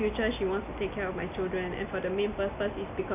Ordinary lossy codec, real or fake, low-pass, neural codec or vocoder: none; real; 3.6 kHz; none